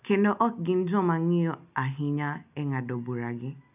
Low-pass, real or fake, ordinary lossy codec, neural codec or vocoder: 3.6 kHz; real; none; none